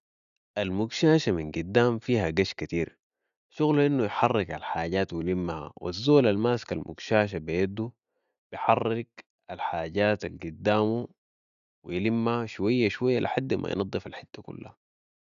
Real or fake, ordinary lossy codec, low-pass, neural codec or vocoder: real; none; 7.2 kHz; none